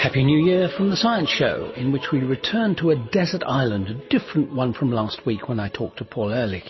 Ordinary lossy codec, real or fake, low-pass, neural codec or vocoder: MP3, 24 kbps; real; 7.2 kHz; none